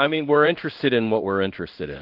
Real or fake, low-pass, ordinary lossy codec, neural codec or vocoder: fake; 5.4 kHz; Opus, 32 kbps; codec, 16 kHz in and 24 kHz out, 1 kbps, XY-Tokenizer